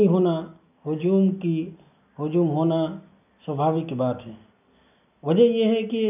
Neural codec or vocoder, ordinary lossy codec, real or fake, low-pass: none; none; real; 3.6 kHz